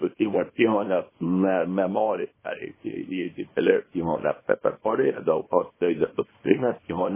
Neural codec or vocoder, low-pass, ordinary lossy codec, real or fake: codec, 24 kHz, 0.9 kbps, WavTokenizer, small release; 3.6 kHz; MP3, 16 kbps; fake